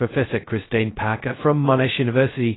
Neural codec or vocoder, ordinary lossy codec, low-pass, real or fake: codec, 16 kHz, 0.2 kbps, FocalCodec; AAC, 16 kbps; 7.2 kHz; fake